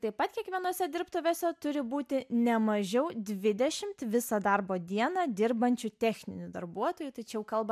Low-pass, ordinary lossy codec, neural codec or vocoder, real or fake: 14.4 kHz; MP3, 96 kbps; none; real